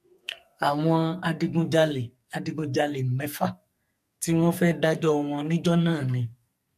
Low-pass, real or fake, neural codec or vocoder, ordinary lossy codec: 14.4 kHz; fake; codec, 44.1 kHz, 2.6 kbps, SNAC; MP3, 64 kbps